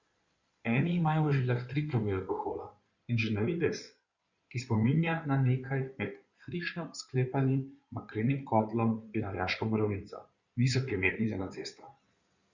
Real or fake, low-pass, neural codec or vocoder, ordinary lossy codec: fake; 7.2 kHz; codec, 16 kHz in and 24 kHz out, 2.2 kbps, FireRedTTS-2 codec; Opus, 64 kbps